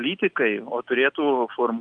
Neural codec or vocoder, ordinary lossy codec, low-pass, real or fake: none; Opus, 32 kbps; 9.9 kHz; real